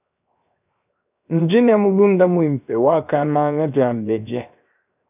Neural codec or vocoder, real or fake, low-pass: codec, 16 kHz, 0.7 kbps, FocalCodec; fake; 3.6 kHz